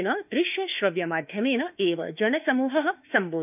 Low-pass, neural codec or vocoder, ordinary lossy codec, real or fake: 3.6 kHz; autoencoder, 48 kHz, 32 numbers a frame, DAC-VAE, trained on Japanese speech; none; fake